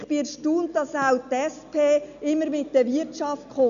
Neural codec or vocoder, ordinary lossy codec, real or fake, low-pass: none; none; real; 7.2 kHz